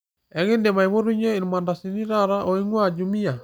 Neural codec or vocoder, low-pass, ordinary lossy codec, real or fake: none; none; none; real